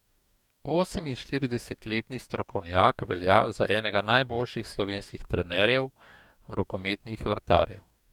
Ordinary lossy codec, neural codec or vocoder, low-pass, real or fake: none; codec, 44.1 kHz, 2.6 kbps, DAC; 19.8 kHz; fake